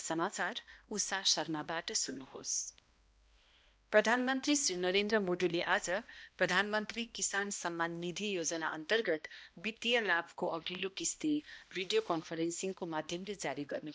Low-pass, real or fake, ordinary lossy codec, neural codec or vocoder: none; fake; none; codec, 16 kHz, 1 kbps, X-Codec, HuBERT features, trained on balanced general audio